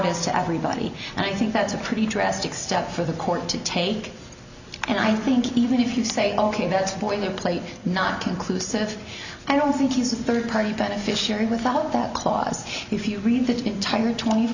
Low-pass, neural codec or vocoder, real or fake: 7.2 kHz; none; real